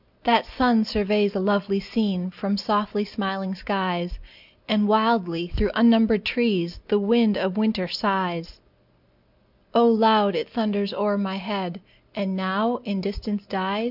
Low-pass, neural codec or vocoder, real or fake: 5.4 kHz; none; real